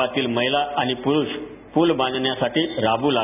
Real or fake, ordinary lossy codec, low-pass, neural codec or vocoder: real; none; 3.6 kHz; none